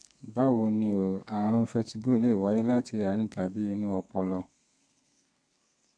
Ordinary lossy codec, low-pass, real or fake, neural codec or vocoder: MP3, 96 kbps; 9.9 kHz; fake; codec, 32 kHz, 1.9 kbps, SNAC